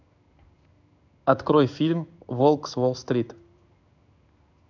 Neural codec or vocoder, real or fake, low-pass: codec, 16 kHz in and 24 kHz out, 1 kbps, XY-Tokenizer; fake; 7.2 kHz